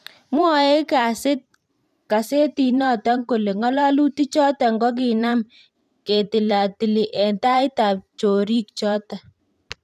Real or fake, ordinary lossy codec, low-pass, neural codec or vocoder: fake; none; 14.4 kHz; vocoder, 44.1 kHz, 128 mel bands every 512 samples, BigVGAN v2